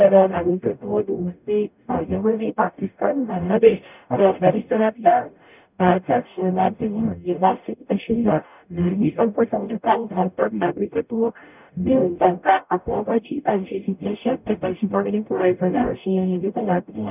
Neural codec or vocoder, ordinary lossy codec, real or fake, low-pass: codec, 44.1 kHz, 0.9 kbps, DAC; none; fake; 3.6 kHz